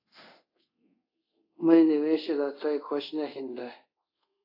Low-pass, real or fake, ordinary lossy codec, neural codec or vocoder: 5.4 kHz; fake; AAC, 32 kbps; codec, 24 kHz, 0.5 kbps, DualCodec